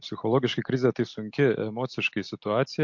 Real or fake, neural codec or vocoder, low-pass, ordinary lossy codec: real; none; 7.2 kHz; MP3, 48 kbps